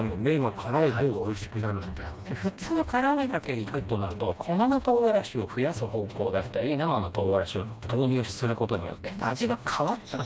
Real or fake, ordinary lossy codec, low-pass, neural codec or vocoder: fake; none; none; codec, 16 kHz, 1 kbps, FreqCodec, smaller model